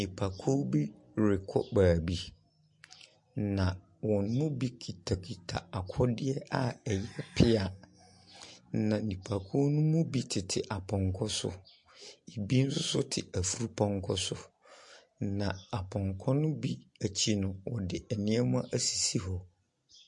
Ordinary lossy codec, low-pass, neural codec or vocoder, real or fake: MP3, 48 kbps; 10.8 kHz; none; real